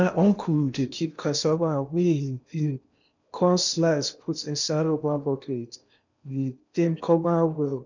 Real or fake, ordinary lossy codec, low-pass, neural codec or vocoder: fake; none; 7.2 kHz; codec, 16 kHz in and 24 kHz out, 0.8 kbps, FocalCodec, streaming, 65536 codes